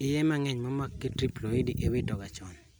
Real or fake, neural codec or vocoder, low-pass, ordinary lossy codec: real; none; none; none